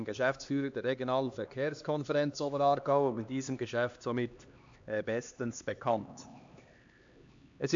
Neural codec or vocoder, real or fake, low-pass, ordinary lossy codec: codec, 16 kHz, 2 kbps, X-Codec, HuBERT features, trained on LibriSpeech; fake; 7.2 kHz; AAC, 64 kbps